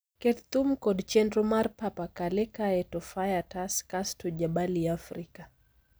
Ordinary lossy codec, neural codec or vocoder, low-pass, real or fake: none; none; none; real